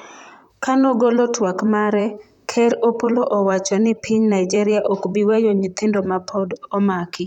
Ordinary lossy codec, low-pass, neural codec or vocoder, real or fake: none; 19.8 kHz; vocoder, 44.1 kHz, 128 mel bands, Pupu-Vocoder; fake